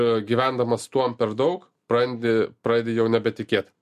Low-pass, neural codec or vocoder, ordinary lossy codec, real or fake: 14.4 kHz; none; MP3, 64 kbps; real